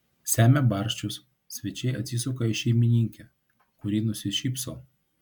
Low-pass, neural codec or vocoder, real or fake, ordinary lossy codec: 19.8 kHz; none; real; MP3, 96 kbps